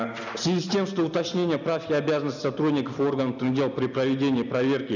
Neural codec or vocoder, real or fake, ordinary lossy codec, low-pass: none; real; none; 7.2 kHz